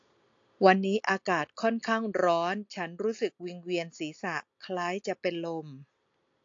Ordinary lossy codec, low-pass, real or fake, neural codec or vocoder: AAC, 48 kbps; 7.2 kHz; real; none